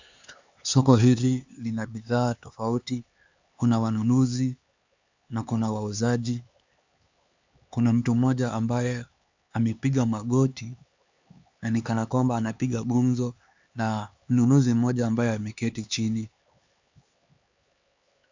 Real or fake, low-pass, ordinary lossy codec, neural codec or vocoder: fake; 7.2 kHz; Opus, 64 kbps; codec, 16 kHz, 4 kbps, X-Codec, HuBERT features, trained on LibriSpeech